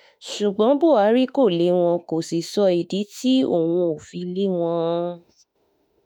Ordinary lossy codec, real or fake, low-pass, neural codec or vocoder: none; fake; none; autoencoder, 48 kHz, 32 numbers a frame, DAC-VAE, trained on Japanese speech